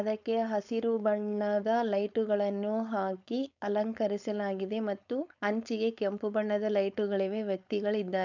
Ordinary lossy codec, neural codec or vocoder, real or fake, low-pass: none; codec, 16 kHz, 4.8 kbps, FACodec; fake; 7.2 kHz